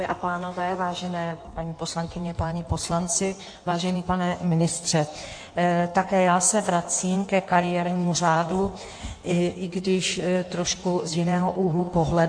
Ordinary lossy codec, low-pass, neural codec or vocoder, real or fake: AAC, 64 kbps; 9.9 kHz; codec, 16 kHz in and 24 kHz out, 1.1 kbps, FireRedTTS-2 codec; fake